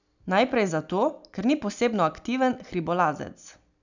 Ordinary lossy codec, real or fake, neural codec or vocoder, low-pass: none; real; none; 7.2 kHz